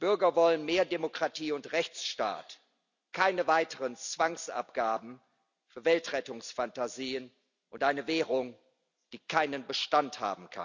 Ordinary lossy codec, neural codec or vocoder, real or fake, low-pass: none; none; real; 7.2 kHz